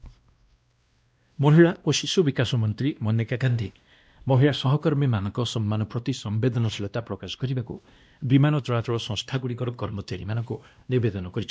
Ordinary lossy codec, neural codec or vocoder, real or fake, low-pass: none; codec, 16 kHz, 1 kbps, X-Codec, WavLM features, trained on Multilingual LibriSpeech; fake; none